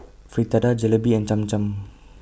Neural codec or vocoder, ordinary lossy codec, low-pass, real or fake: none; none; none; real